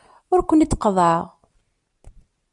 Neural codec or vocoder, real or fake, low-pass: none; real; 10.8 kHz